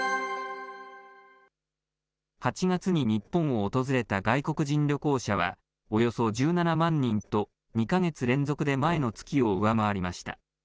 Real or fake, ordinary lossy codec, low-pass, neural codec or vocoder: real; none; none; none